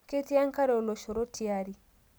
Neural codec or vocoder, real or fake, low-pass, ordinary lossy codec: none; real; none; none